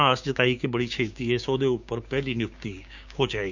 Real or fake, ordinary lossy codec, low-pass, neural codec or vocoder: fake; none; 7.2 kHz; codec, 16 kHz, 6 kbps, DAC